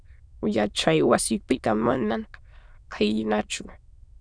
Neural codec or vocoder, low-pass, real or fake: autoencoder, 22.05 kHz, a latent of 192 numbers a frame, VITS, trained on many speakers; 9.9 kHz; fake